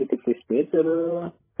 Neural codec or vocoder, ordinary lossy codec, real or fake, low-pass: codec, 16 kHz, 16 kbps, FreqCodec, larger model; MP3, 16 kbps; fake; 3.6 kHz